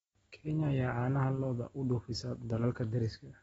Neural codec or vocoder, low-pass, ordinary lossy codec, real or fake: none; 19.8 kHz; AAC, 24 kbps; real